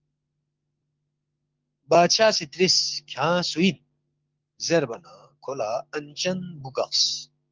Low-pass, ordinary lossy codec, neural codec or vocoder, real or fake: 7.2 kHz; Opus, 32 kbps; codec, 16 kHz, 6 kbps, DAC; fake